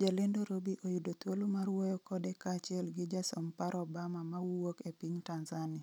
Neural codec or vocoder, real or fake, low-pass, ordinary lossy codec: none; real; none; none